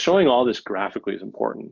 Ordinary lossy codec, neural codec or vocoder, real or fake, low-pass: MP3, 48 kbps; none; real; 7.2 kHz